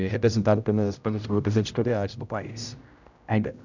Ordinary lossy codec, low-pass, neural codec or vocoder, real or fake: none; 7.2 kHz; codec, 16 kHz, 0.5 kbps, X-Codec, HuBERT features, trained on general audio; fake